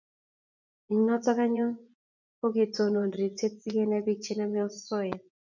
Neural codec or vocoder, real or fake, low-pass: vocoder, 24 kHz, 100 mel bands, Vocos; fake; 7.2 kHz